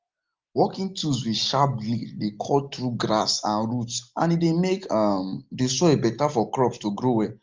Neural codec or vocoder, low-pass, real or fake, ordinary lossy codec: none; 7.2 kHz; real; Opus, 32 kbps